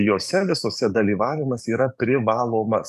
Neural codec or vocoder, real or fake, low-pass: codec, 44.1 kHz, 7.8 kbps, DAC; fake; 14.4 kHz